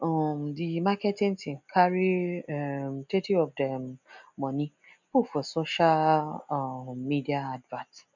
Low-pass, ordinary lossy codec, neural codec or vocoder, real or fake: 7.2 kHz; none; none; real